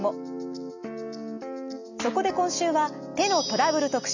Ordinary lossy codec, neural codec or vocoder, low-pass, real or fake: none; none; 7.2 kHz; real